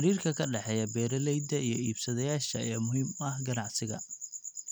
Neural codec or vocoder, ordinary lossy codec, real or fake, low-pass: none; none; real; none